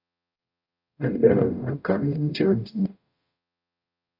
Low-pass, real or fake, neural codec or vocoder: 5.4 kHz; fake; codec, 44.1 kHz, 0.9 kbps, DAC